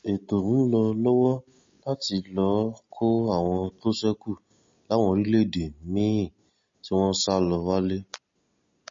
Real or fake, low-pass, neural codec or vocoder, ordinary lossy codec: real; 7.2 kHz; none; MP3, 32 kbps